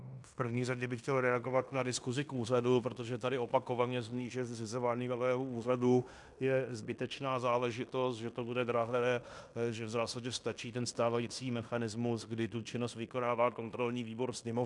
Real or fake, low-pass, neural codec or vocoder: fake; 10.8 kHz; codec, 16 kHz in and 24 kHz out, 0.9 kbps, LongCat-Audio-Codec, fine tuned four codebook decoder